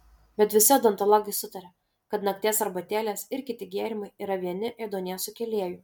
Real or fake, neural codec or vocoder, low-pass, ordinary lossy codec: real; none; 19.8 kHz; MP3, 96 kbps